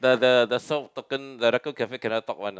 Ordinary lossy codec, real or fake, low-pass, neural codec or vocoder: none; real; none; none